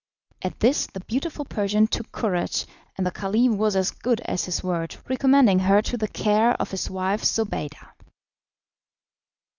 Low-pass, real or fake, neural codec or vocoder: 7.2 kHz; real; none